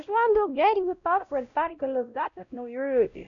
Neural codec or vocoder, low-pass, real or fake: codec, 16 kHz, 0.5 kbps, X-Codec, WavLM features, trained on Multilingual LibriSpeech; 7.2 kHz; fake